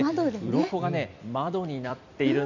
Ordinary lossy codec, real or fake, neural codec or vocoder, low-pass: none; fake; vocoder, 44.1 kHz, 128 mel bands every 256 samples, BigVGAN v2; 7.2 kHz